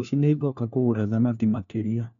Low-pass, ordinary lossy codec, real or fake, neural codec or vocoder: 7.2 kHz; none; fake; codec, 16 kHz, 1 kbps, FunCodec, trained on LibriTTS, 50 frames a second